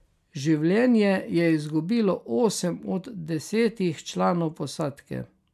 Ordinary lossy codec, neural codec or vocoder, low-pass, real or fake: none; none; 14.4 kHz; real